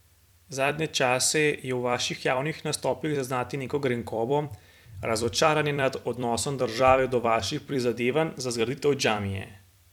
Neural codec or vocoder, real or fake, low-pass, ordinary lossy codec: vocoder, 44.1 kHz, 128 mel bands every 256 samples, BigVGAN v2; fake; 19.8 kHz; none